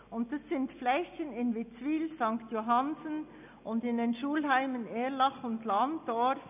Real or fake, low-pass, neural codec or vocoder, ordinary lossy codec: real; 3.6 kHz; none; none